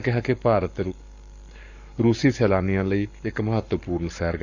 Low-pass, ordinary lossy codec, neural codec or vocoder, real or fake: 7.2 kHz; none; codec, 44.1 kHz, 7.8 kbps, Pupu-Codec; fake